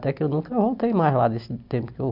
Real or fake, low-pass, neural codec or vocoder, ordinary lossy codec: real; 5.4 kHz; none; none